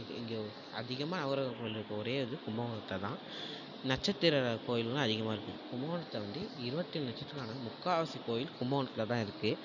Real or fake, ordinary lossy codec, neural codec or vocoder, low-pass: real; none; none; 7.2 kHz